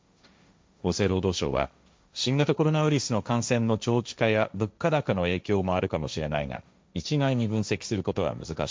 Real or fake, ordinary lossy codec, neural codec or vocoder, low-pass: fake; none; codec, 16 kHz, 1.1 kbps, Voila-Tokenizer; none